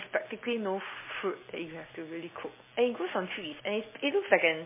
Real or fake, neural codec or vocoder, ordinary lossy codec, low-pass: real; none; MP3, 16 kbps; 3.6 kHz